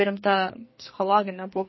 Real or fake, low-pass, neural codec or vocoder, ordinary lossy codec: fake; 7.2 kHz; codec, 44.1 kHz, 2.6 kbps, SNAC; MP3, 24 kbps